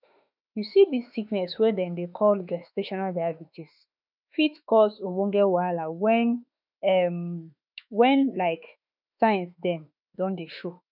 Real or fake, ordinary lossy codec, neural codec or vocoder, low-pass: fake; none; autoencoder, 48 kHz, 32 numbers a frame, DAC-VAE, trained on Japanese speech; 5.4 kHz